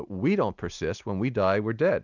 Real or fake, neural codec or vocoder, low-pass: real; none; 7.2 kHz